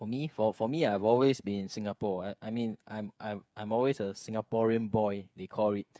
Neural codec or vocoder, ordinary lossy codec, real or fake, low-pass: codec, 16 kHz, 8 kbps, FreqCodec, smaller model; none; fake; none